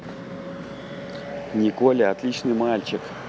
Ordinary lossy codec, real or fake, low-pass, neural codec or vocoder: none; real; none; none